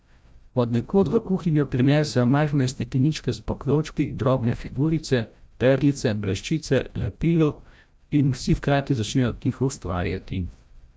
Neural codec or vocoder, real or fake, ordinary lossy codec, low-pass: codec, 16 kHz, 0.5 kbps, FreqCodec, larger model; fake; none; none